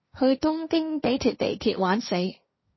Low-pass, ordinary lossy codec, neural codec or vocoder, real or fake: 7.2 kHz; MP3, 24 kbps; codec, 16 kHz, 1.1 kbps, Voila-Tokenizer; fake